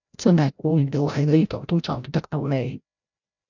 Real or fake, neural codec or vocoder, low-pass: fake; codec, 16 kHz, 0.5 kbps, FreqCodec, larger model; 7.2 kHz